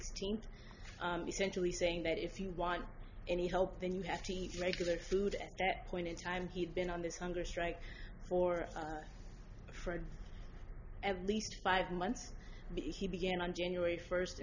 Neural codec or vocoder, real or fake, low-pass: none; real; 7.2 kHz